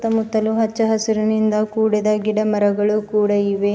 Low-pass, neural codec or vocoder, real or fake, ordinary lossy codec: none; none; real; none